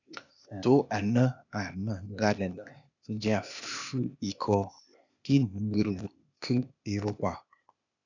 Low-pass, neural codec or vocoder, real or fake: 7.2 kHz; codec, 16 kHz, 0.8 kbps, ZipCodec; fake